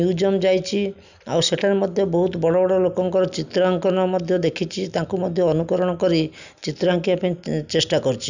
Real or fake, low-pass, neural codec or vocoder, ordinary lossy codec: real; 7.2 kHz; none; none